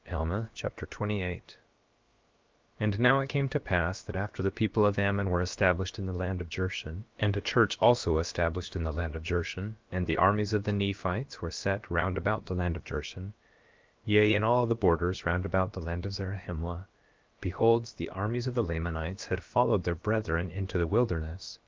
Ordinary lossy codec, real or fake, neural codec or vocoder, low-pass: Opus, 32 kbps; fake; codec, 16 kHz, about 1 kbps, DyCAST, with the encoder's durations; 7.2 kHz